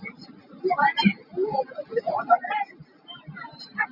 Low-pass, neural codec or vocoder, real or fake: 5.4 kHz; none; real